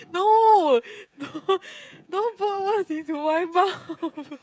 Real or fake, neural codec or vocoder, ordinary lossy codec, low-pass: fake; codec, 16 kHz, 16 kbps, FreqCodec, smaller model; none; none